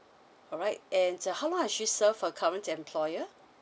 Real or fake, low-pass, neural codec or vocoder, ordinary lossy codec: real; none; none; none